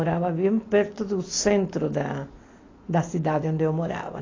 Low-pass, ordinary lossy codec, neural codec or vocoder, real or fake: 7.2 kHz; AAC, 32 kbps; none; real